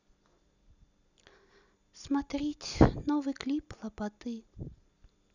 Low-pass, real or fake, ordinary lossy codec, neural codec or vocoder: 7.2 kHz; real; none; none